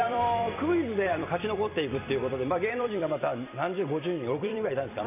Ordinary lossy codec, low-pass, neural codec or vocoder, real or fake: none; 3.6 kHz; vocoder, 44.1 kHz, 128 mel bands every 256 samples, BigVGAN v2; fake